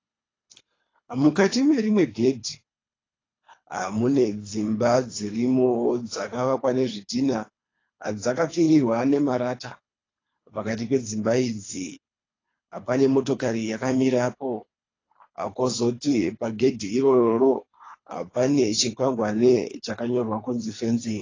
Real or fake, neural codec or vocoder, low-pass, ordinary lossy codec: fake; codec, 24 kHz, 3 kbps, HILCodec; 7.2 kHz; AAC, 32 kbps